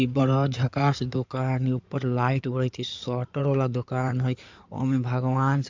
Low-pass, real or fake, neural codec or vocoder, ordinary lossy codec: 7.2 kHz; fake; codec, 16 kHz in and 24 kHz out, 2.2 kbps, FireRedTTS-2 codec; MP3, 64 kbps